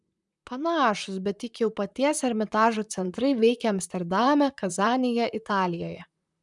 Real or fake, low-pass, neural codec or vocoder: fake; 10.8 kHz; vocoder, 44.1 kHz, 128 mel bands, Pupu-Vocoder